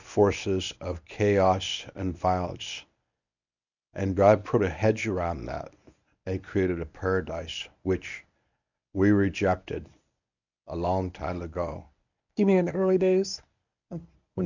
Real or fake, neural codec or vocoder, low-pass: fake; codec, 24 kHz, 0.9 kbps, WavTokenizer, medium speech release version 1; 7.2 kHz